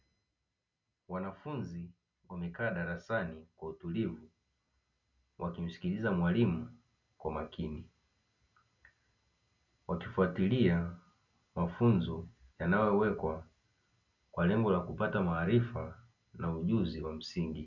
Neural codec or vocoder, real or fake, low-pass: none; real; 7.2 kHz